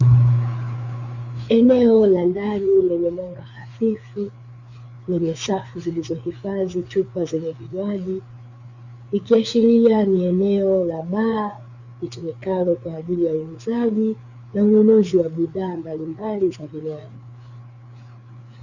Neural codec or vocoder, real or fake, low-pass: codec, 16 kHz, 4 kbps, FreqCodec, larger model; fake; 7.2 kHz